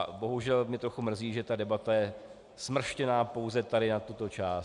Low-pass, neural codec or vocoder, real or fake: 10.8 kHz; none; real